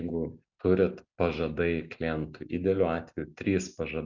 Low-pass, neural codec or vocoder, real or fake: 7.2 kHz; none; real